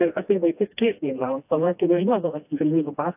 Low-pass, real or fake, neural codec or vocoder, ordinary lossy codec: 3.6 kHz; fake; codec, 16 kHz, 1 kbps, FreqCodec, smaller model; AAC, 32 kbps